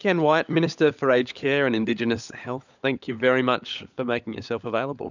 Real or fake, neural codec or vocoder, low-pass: fake; codec, 16 kHz, 16 kbps, FunCodec, trained on LibriTTS, 50 frames a second; 7.2 kHz